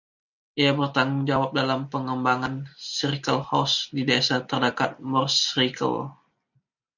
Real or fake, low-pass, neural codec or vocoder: real; 7.2 kHz; none